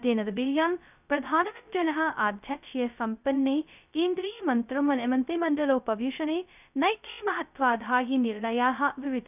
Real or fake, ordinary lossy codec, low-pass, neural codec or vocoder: fake; none; 3.6 kHz; codec, 16 kHz, 0.2 kbps, FocalCodec